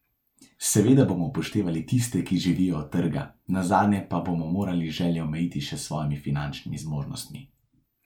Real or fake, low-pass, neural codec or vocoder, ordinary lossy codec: real; 19.8 kHz; none; MP3, 96 kbps